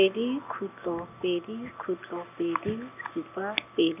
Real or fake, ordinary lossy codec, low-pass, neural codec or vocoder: real; none; 3.6 kHz; none